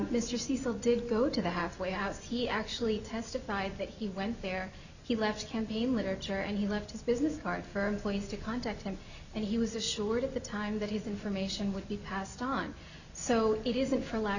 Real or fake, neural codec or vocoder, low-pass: real; none; 7.2 kHz